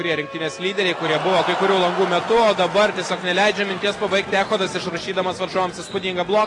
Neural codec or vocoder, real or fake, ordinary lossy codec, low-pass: none; real; AAC, 32 kbps; 10.8 kHz